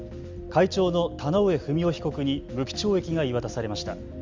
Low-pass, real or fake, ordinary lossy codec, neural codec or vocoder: 7.2 kHz; real; Opus, 32 kbps; none